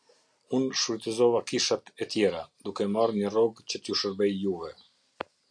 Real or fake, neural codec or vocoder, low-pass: real; none; 9.9 kHz